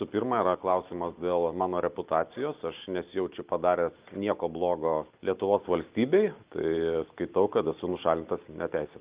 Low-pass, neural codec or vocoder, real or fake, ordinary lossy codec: 3.6 kHz; none; real; Opus, 24 kbps